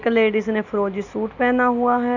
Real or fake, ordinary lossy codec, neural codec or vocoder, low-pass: real; none; none; 7.2 kHz